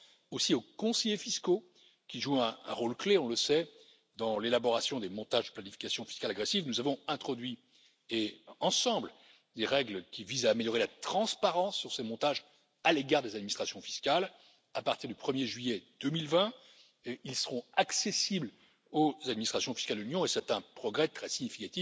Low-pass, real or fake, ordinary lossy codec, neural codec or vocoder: none; real; none; none